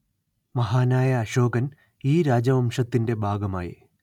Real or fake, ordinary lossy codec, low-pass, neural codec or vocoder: real; none; 19.8 kHz; none